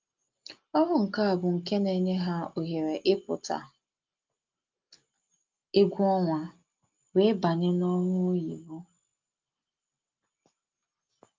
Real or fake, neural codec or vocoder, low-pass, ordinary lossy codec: real; none; 7.2 kHz; Opus, 24 kbps